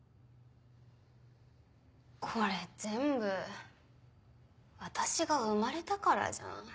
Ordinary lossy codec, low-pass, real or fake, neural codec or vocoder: none; none; real; none